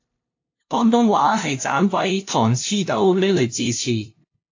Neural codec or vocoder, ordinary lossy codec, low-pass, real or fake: codec, 16 kHz, 0.5 kbps, FunCodec, trained on LibriTTS, 25 frames a second; AAC, 48 kbps; 7.2 kHz; fake